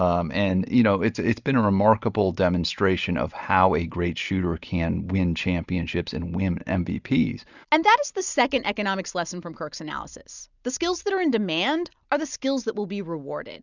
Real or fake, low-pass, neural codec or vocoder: real; 7.2 kHz; none